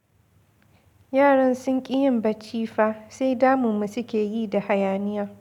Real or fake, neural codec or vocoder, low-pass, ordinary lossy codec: real; none; 19.8 kHz; none